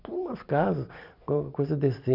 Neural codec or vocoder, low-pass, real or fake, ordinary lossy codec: none; 5.4 kHz; real; none